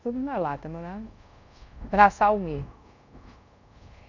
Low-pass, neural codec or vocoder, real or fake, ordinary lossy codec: 7.2 kHz; codec, 24 kHz, 0.5 kbps, DualCodec; fake; none